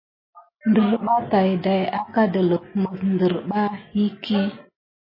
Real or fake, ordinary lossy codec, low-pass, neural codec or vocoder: real; MP3, 24 kbps; 5.4 kHz; none